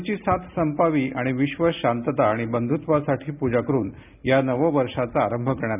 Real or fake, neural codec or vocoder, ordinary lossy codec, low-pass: real; none; none; 3.6 kHz